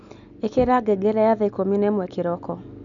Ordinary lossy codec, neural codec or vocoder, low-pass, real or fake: none; none; 7.2 kHz; real